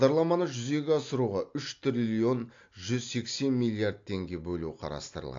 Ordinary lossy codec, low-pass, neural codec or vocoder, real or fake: AAC, 48 kbps; 7.2 kHz; none; real